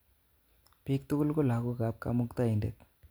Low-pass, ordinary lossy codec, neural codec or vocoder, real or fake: none; none; none; real